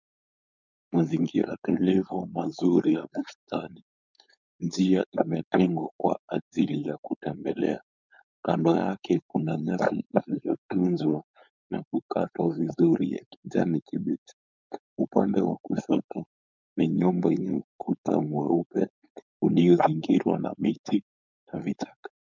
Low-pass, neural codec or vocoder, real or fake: 7.2 kHz; codec, 16 kHz, 4.8 kbps, FACodec; fake